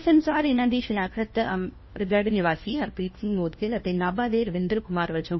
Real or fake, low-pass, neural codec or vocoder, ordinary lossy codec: fake; 7.2 kHz; codec, 16 kHz, 1 kbps, FunCodec, trained on LibriTTS, 50 frames a second; MP3, 24 kbps